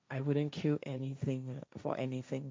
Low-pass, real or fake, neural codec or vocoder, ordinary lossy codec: none; fake; codec, 16 kHz, 1.1 kbps, Voila-Tokenizer; none